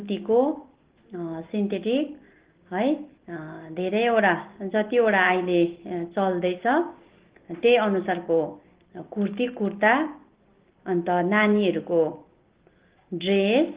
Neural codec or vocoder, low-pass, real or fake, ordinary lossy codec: none; 3.6 kHz; real; Opus, 16 kbps